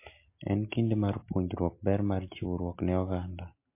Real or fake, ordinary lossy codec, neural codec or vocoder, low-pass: real; MP3, 24 kbps; none; 3.6 kHz